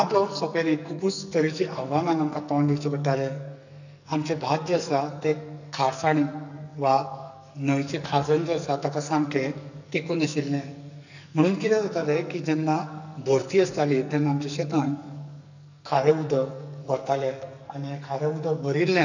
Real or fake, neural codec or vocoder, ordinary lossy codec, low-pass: fake; codec, 44.1 kHz, 2.6 kbps, SNAC; none; 7.2 kHz